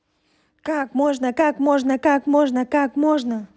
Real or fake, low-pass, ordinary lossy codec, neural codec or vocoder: real; none; none; none